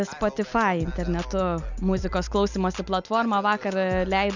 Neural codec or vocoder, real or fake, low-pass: none; real; 7.2 kHz